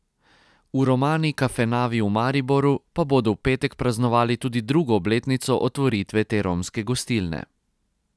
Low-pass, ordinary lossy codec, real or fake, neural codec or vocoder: none; none; real; none